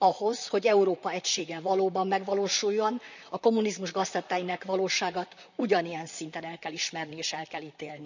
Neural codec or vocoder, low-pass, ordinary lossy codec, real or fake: vocoder, 44.1 kHz, 128 mel bands, Pupu-Vocoder; 7.2 kHz; none; fake